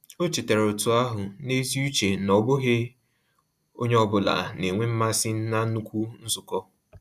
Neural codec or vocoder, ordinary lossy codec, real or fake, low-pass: none; none; real; 14.4 kHz